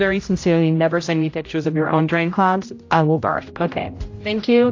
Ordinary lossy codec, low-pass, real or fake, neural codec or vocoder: AAC, 48 kbps; 7.2 kHz; fake; codec, 16 kHz, 0.5 kbps, X-Codec, HuBERT features, trained on general audio